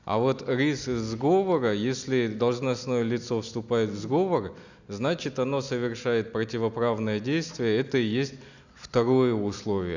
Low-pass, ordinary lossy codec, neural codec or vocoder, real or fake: 7.2 kHz; none; none; real